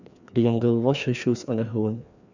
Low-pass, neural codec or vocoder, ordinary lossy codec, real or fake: 7.2 kHz; codec, 16 kHz, 2 kbps, FreqCodec, larger model; none; fake